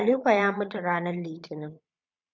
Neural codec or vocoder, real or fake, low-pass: vocoder, 22.05 kHz, 80 mel bands, Vocos; fake; 7.2 kHz